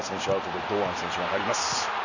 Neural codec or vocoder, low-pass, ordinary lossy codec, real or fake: none; 7.2 kHz; none; real